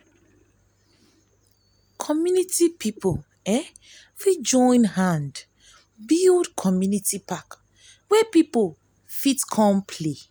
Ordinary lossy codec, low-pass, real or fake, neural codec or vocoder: none; none; real; none